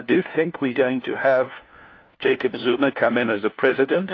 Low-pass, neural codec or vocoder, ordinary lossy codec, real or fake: 7.2 kHz; codec, 16 kHz, 1 kbps, FunCodec, trained on LibriTTS, 50 frames a second; AAC, 32 kbps; fake